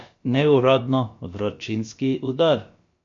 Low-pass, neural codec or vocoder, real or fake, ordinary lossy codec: 7.2 kHz; codec, 16 kHz, about 1 kbps, DyCAST, with the encoder's durations; fake; MP3, 48 kbps